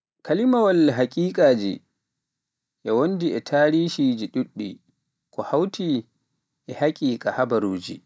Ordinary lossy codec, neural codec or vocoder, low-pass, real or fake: none; none; none; real